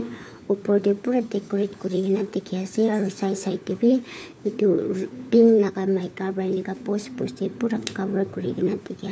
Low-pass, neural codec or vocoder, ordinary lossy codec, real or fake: none; codec, 16 kHz, 4 kbps, FunCodec, trained on LibriTTS, 50 frames a second; none; fake